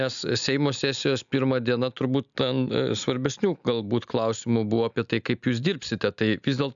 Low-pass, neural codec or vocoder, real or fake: 7.2 kHz; none; real